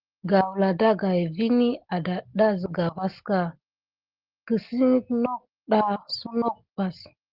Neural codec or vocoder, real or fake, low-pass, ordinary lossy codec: none; real; 5.4 kHz; Opus, 16 kbps